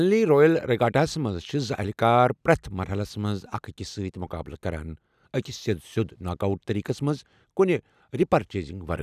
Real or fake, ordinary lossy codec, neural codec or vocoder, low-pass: real; none; none; 14.4 kHz